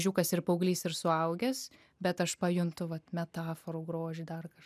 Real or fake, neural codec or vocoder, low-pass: real; none; 14.4 kHz